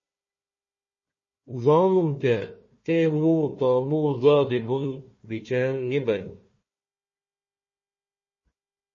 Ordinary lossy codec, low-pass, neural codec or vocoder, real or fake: MP3, 32 kbps; 7.2 kHz; codec, 16 kHz, 1 kbps, FunCodec, trained on Chinese and English, 50 frames a second; fake